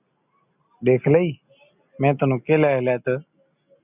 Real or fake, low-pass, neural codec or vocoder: real; 3.6 kHz; none